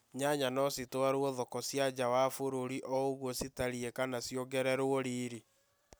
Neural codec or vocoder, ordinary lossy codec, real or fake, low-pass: none; none; real; none